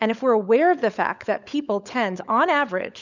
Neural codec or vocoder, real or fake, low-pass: codec, 16 kHz, 16 kbps, FunCodec, trained on LibriTTS, 50 frames a second; fake; 7.2 kHz